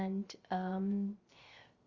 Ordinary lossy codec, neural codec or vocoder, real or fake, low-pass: Opus, 32 kbps; codec, 16 kHz, 0.3 kbps, FocalCodec; fake; 7.2 kHz